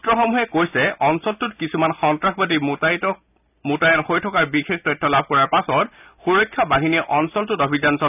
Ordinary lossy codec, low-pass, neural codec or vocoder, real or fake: none; 3.6 kHz; none; real